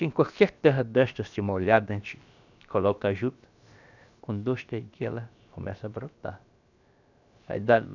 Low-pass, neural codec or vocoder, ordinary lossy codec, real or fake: 7.2 kHz; codec, 16 kHz, about 1 kbps, DyCAST, with the encoder's durations; none; fake